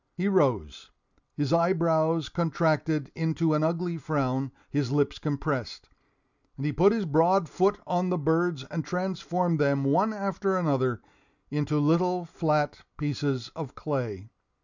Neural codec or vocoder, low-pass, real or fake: none; 7.2 kHz; real